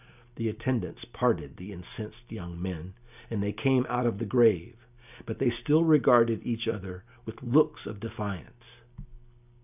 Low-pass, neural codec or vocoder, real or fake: 3.6 kHz; none; real